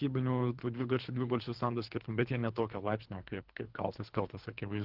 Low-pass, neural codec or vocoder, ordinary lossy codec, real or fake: 5.4 kHz; codec, 24 kHz, 3 kbps, HILCodec; Opus, 16 kbps; fake